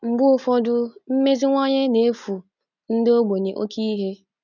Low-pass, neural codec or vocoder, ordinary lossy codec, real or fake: 7.2 kHz; none; none; real